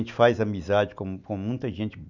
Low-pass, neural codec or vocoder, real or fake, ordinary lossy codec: 7.2 kHz; none; real; none